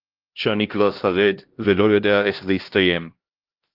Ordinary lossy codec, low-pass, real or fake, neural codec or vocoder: Opus, 16 kbps; 5.4 kHz; fake; codec, 16 kHz, 1 kbps, X-Codec, HuBERT features, trained on LibriSpeech